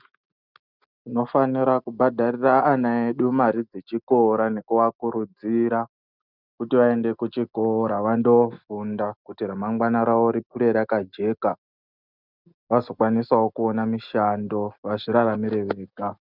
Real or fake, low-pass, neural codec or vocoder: real; 5.4 kHz; none